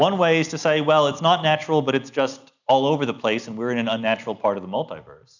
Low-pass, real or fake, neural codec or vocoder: 7.2 kHz; real; none